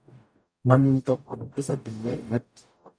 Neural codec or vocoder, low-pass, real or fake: codec, 44.1 kHz, 0.9 kbps, DAC; 9.9 kHz; fake